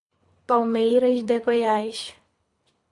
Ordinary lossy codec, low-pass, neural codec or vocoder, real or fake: AAC, 64 kbps; 10.8 kHz; codec, 24 kHz, 3 kbps, HILCodec; fake